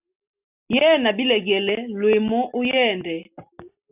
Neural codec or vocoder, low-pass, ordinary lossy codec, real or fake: none; 3.6 kHz; AAC, 32 kbps; real